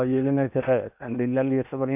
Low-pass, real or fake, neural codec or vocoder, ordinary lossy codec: 3.6 kHz; fake; codec, 16 kHz in and 24 kHz out, 0.8 kbps, FocalCodec, streaming, 65536 codes; none